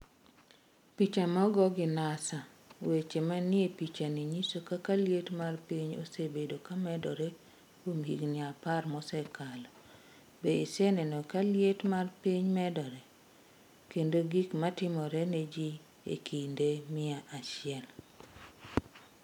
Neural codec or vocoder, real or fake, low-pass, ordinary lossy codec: none; real; 19.8 kHz; none